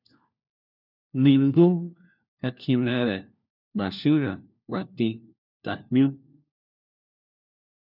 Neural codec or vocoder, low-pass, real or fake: codec, 16 kHz, 1 kbps, FunCodec, trained on LibriTTS, 50 frames a second; 5.4 kHz; fake